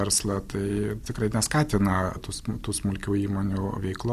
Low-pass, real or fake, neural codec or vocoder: 14.4 kHz; real; none